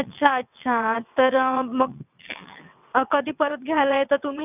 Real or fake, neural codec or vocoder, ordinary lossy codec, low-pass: fake; vocoder, 22.05 kHz, 80 mel bands, WaveNeXt; none; 3.6 kHz